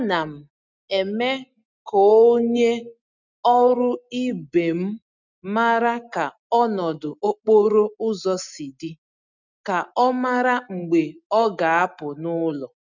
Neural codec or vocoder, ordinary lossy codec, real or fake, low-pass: none; none; real; 7.2 kHz